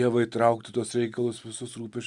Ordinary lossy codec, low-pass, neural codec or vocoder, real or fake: Opus, 64 kbps; 10.8 kHz; none; real